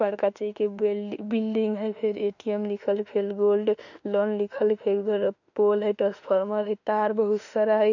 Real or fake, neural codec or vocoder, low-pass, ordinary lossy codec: fake; autoencoder, 48 kHz, 32 numbers a frame, DAC-VAE, trained on Japanese speech; 7.2 kHz; none